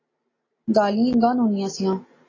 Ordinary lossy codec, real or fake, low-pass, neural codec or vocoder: AAC, 32 kbps; real; 7.2 kHz; none